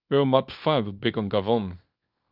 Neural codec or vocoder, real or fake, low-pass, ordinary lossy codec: codec, 24 kHz, 0.9 kbps, WavTokenizer, small release; fake; 5.4 kHz; Opus, 64 kbps